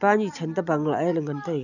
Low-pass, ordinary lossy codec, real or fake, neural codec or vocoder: 7.2 kHz; none; real; none